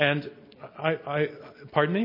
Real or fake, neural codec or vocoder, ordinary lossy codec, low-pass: real; none; MP3, 24 kbps; 5.4 kHz